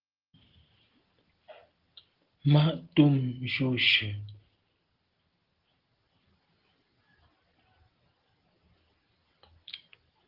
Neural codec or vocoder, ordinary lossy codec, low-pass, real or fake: none; Opus, 16 kbps; 5.4 kHz; real